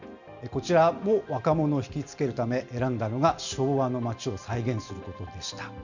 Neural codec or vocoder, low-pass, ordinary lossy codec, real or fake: none; 7.2 kHz; none; real